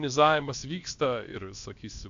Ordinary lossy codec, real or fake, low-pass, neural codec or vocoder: AAC, 64 kbps; fake; 7.2 kHz; codec, 16 kHz, about 1 kbps, DyCAST, with the encoder's durations